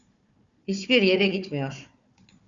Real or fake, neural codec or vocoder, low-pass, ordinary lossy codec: fake; codec, 16 kHz, 4 kbps, FunCodec, trained on Chinese and English, 50 frames a second; 7.2 kHz; Opus, 64 kbps